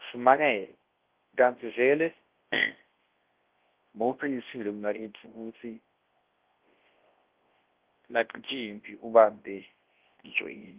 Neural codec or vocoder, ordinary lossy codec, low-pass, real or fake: codec, 24 kHz, 0.9 kbps, WavTokenizer, large speech release; Opus, 16 kbps; 3.6 kHz; fake